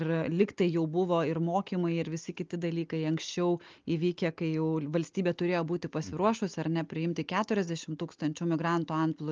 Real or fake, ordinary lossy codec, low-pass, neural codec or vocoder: real; Opus, 24 kbps; 7.2 kHz; none